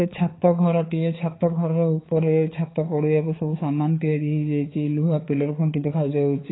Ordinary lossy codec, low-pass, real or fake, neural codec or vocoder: AAC, 16 kbps; 7.2 kHz; fake; codec, 16 kHz, 4 kbps, X-Codec, HuBERT features, trained on balanced general audio